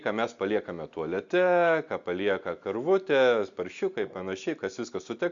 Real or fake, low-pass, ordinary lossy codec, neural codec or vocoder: real; 7.2 kHz; Opus, 64 kbps; none